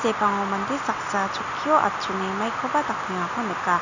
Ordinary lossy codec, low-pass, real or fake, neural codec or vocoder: none; 7.2 kHz; real; none